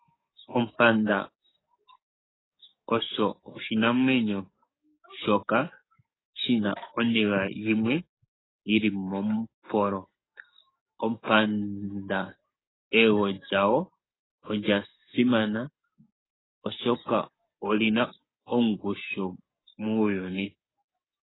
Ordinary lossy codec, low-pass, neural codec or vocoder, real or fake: AAC, 16 kbps; 7.2 kHz; codec, 44.1 kHz, 7.8 kbps, DAC; fake